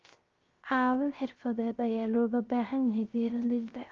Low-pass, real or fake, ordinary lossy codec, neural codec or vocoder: 7.2 kHz; fake; Opus, 32 kbps; codec, 16 kHz, 0.3 kbps, FocalCodec